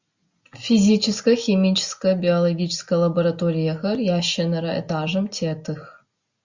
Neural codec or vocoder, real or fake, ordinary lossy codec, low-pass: none; real; Opus, 64 kbps; 7.2 kHz